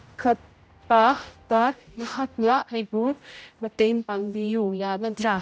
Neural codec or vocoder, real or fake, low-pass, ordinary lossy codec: codec, 16 kHz, 0.5 kbps, X-Codec, HuBERT features, trained on general audio; fake; none; none